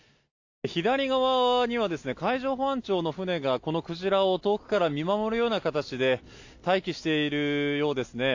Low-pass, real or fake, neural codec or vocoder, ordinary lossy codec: 7.2 kHz; real; none; AAC, 48 kbps